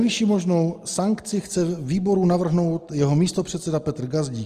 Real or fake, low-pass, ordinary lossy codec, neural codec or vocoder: real; 14.4 kHz; Opus, 24 kbps; none